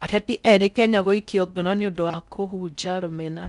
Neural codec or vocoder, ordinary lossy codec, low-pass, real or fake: codec, 16 kHz in and 24 kHz out, 0.6 kbps, FocalCodec, streaming, 4096 codes; none; 10.8 kHz; fake